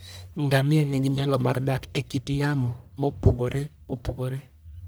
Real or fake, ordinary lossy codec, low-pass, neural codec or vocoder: fake; none; none; codec, 44.1 kHz, 1.7 kbps, Pupu-Codec